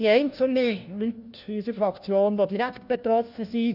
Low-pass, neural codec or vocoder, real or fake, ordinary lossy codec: 5.4 kHz; codec, 16 kHz, 1 kbps, FunCodec, trained on LibriTTS, 50 frames a second; fake; none